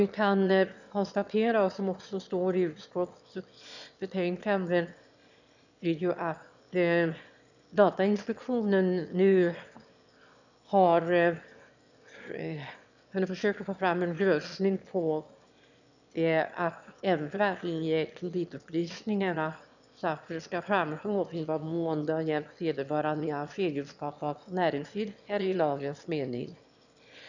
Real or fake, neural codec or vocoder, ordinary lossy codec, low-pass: fake; autoencoder, 22.05 kHz, a latent of 192 numbers a frame, VITS, trained on one speaker; none; 7.2 kHz